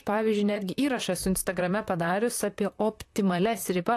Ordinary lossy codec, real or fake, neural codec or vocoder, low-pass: AAC, 64 kbps; fake; vocoder, 44.1 kHz, 128 mel bands, Pupu-Vocoder; 14.4 kHz